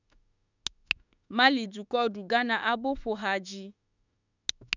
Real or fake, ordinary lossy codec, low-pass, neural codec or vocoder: fake; none; 7.2 kHz; autoencoder, 48 kHz, 32 numbers a frame, DAC-VAE, trained on Japanese speech